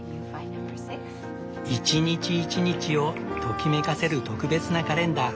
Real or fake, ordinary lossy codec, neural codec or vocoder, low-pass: real; none; none; none